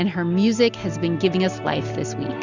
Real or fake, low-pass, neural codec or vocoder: real; 7.2 kHz; none